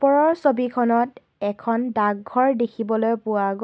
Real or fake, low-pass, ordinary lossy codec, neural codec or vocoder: real; none; none; none